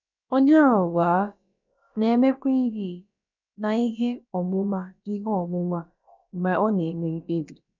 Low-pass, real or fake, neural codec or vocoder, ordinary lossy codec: 7.2 kHz; fake; codec, 16 kHz, about 1 kbps, DyCAST, with the encoder's durations; none